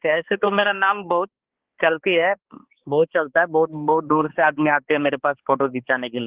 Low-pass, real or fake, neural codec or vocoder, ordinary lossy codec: 3.6 kHz; fake; codec, 16 kHz, 2 kbps, X-Codec, HuBERT features, trained on balanced general audio; Opus, 16 kbps